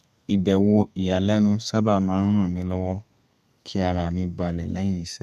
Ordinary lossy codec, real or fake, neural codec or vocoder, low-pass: none; fake; codec, 32 kHz, 1.9 kbps, SNAC; 14.4 kHz